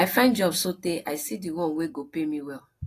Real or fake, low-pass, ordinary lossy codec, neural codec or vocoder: real; 14.4 kHz; AAC, 48 kbps; none